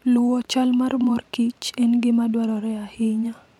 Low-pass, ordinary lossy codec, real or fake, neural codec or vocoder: 19.8 kHz; MP3, 96 kbps; fake; vocoder, 44.1 kHz, 128 mel bands every 512 samples, BigVGAN v2